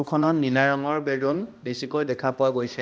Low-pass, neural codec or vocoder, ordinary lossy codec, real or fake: none; codec, 16 kHz, 1 kbps, X-Codec, HuBERT features, trained on balanced general audio; none; fake